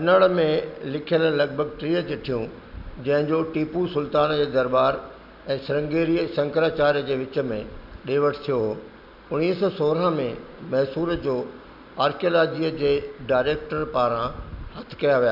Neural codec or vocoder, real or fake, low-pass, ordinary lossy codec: none; real; 5.4 kHz; none